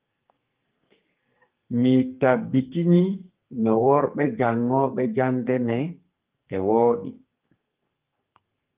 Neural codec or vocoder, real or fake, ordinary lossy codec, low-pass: codec, 44.1 kHz, 2.6 kbps, SNAC; fake; Opus, 32 kbps; 3.6 kHz